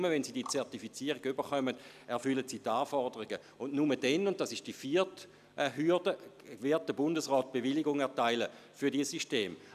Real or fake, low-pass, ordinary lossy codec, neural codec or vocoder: real; 14.4 kHz; none; none